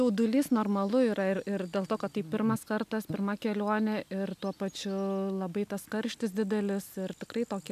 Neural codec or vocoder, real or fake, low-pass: none; real; 14.4 kHz